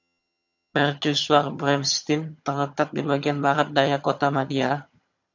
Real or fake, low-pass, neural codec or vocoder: fake; 7.2 kHz; vocoder, 22.05 kHz, 80 mel bands, HiFi-GAN